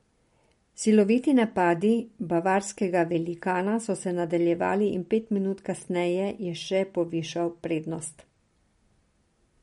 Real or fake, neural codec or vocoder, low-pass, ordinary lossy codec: real; none; 10.8 kHz; MP3, 48 kbps